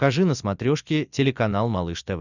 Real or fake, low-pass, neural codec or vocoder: real; 7.2 kHz; none